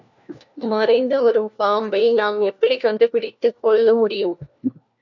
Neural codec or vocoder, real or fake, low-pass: codec, 16 kHz, 1 kbps, FunCodec, trained on LibriTTS, 50 frames a second; fake; 7.2 kHz